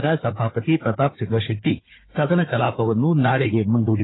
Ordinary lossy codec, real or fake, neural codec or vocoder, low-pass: AAC, 16 kbps; fake; codec, 16 kHz, 4 kbps, FunCodec, trained on Chinese and English, 50 frames a second; 7.2 kHz